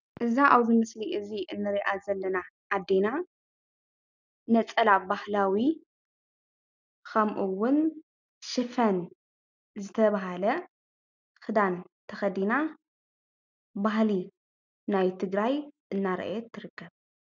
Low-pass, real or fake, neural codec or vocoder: 7.2 kHz; real; none